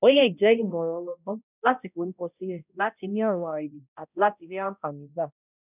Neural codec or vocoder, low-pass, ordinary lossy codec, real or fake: codec, 16 kHz, 0.5 kbps, X-Codec, HuBERT features, trained on balanced general audio; 3.6 kHz; none; fake